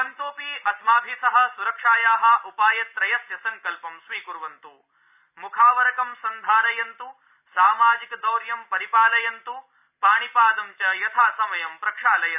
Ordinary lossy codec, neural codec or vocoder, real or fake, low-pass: none; none; real; 3.6 kHz